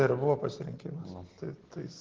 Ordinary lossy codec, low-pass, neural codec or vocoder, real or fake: Opus, 16 kbps; 7.2 kHz; none; real